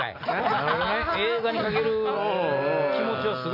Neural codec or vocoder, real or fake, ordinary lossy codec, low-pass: none; real; AAC, 32 kbps; 5.4 kHz